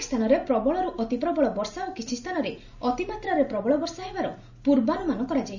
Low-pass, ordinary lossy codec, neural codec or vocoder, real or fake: 7.2 kHz; none; none; real